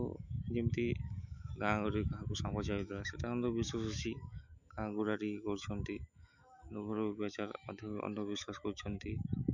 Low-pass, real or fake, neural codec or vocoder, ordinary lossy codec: 7.2 kHz; fake; autoencoder, 48 kHz, 128 numbers a frame, DAC-VAE, trained on Japanese speech; none